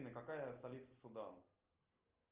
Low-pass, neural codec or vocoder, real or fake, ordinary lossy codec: 3.6 kHz; none; real; Opus, 32 kbps